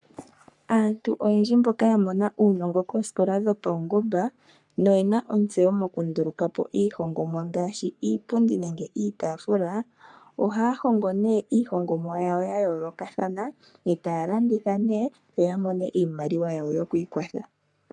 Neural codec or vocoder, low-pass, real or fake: codec, 44.1 kHz, 3.4 kbps, Pupu-Codec; 10.8 kHz; fake